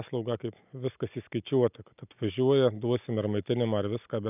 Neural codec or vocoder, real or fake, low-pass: none; real; 3.6 kHz